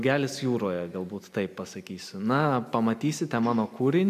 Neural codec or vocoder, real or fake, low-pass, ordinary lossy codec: none; real; 14.4 kHz; AAC, 96 kbps